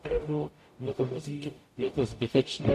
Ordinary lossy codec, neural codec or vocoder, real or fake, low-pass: AAC, 64 kbps; codec, 44.1 kHz, 0.9 kbps, DAC; fake; 14.4 kHz